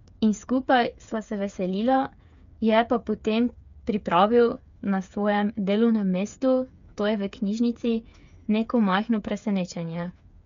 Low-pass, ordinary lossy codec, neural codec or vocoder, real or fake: 7.2 kHz; MP3, 64 kbps; codec, 16 kHz, 8 kbps, FreqCodec, smaller model; fake